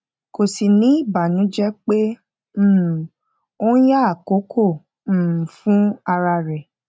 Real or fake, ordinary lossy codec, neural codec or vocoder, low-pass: real; none; none; none